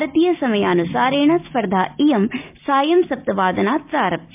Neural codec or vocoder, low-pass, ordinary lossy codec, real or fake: none; 3.6 kHz; MP3, 32 kbps; real